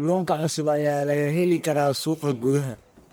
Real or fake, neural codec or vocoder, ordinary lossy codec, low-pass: fake; codec, 44.1 kHz, 1.7 kbps, Pupu-Codec; none; none